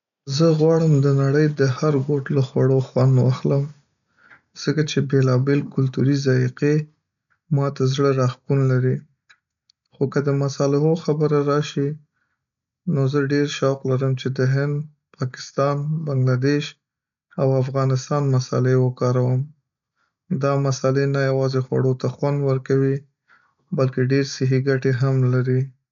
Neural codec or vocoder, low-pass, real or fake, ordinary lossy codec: none; 7.2 kHz; real; MP3, 96 kbps